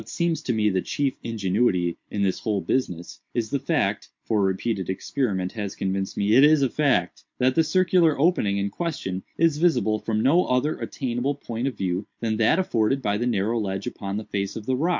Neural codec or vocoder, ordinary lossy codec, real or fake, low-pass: none; MP3, 64 kbps; real; 7.2 kHz